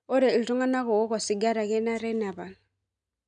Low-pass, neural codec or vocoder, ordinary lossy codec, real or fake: 9.9 kHz; none; MP3, 96 kbps; real